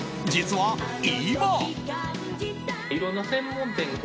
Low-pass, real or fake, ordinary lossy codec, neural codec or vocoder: none; real; none; none